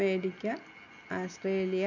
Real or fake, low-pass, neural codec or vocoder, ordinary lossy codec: real; 7.2 kHz; none; none